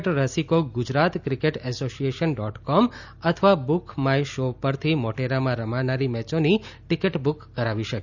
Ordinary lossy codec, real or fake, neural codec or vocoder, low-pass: none; real; none; 7.2 kHz